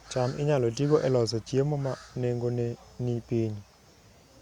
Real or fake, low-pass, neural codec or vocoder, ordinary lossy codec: real; 19.8 kHz; none; Opus, 64 kbps